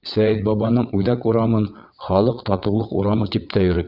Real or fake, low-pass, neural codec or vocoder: fake; 5.4 kHz; vocoder, 22.05 kHz, 80 mel bands, WaveNeXt